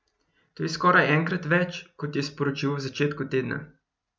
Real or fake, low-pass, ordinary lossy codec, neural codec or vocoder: real; none; none; none